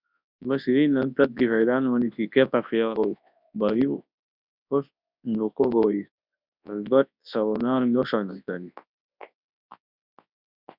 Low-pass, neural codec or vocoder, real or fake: 5.4 kHz; codec, 24 kHz, 0.9 kbps, WavTokenizer, large speech release; fake